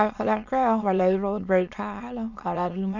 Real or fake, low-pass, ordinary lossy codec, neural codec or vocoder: fake; 7.2 kHz; none; autoencoder, 22.05 kHz, a latent of 192 numbers a frame, VITS, trained on many speakers